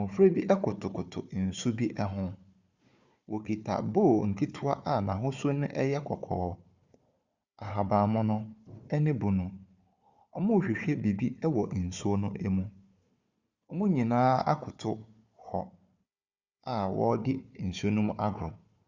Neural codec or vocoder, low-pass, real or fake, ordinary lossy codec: codec, 16 kHz, 4 kbps, FunCodec, trained on Chinese and English, 50 frames a second; 7.2 kHz; fake; Opus, 64 kbps